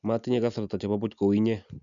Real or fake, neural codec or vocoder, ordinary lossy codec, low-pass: real; none; none; 7.2 kHz